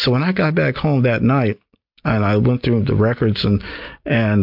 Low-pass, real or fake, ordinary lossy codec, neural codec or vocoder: 5.4 kHz; real; MP3, 48 kbps; none